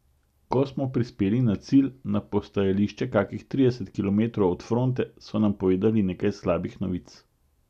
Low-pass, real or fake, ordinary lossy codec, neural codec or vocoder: 14.4 kHz; real; none; none